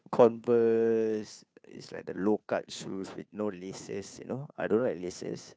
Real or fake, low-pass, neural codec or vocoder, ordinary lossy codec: fake; none; codec, 16 kHz, 2 kbps, FunCodec, trained on Chinese and English, 25 frames a second; none